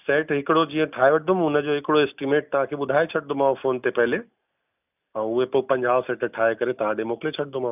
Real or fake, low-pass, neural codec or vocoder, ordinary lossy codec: real; 3.6 kHz; none; none